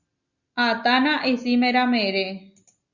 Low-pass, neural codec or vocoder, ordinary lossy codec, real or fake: 7.2 kHz; none; Opus, 64 kbps; real